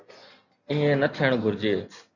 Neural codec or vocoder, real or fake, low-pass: none; real; 7.2 kHz